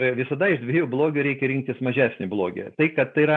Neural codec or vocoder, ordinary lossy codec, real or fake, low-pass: none; MP3, 96 kbps; real; 9.9 kHz